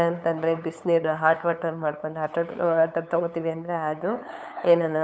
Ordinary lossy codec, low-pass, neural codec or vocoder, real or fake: none; none; codec, 16 kHz, 8 kbps, FunCodec, trained on LibriTTS, 25 frames a second; fake